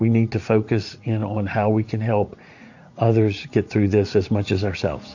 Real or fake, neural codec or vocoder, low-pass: fake; vocoder, 44.1 kHz, 80 mel bands, Vocos; 7.2 kHz